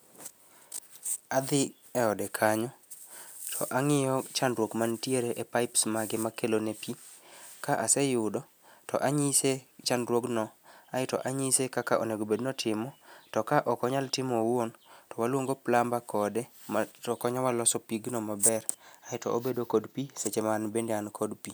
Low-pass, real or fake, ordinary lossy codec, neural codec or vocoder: none; real; none; none